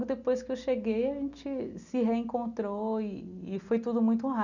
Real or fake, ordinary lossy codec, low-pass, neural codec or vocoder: real; none; 7.2 kHz; none